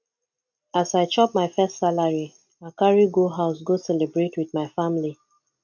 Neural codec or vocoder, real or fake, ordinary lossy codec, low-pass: none; real; none; 7.2 kHz